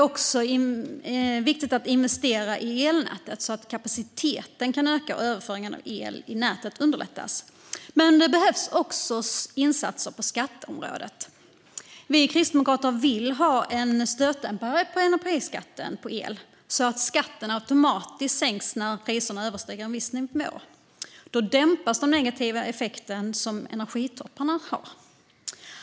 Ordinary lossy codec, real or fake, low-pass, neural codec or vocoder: none; real; none; none